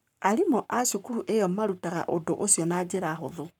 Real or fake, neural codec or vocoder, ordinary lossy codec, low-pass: fake; codec, 44.1 kHz, 7.8 kbps, Pupu-Codec; none; 19.8 kHz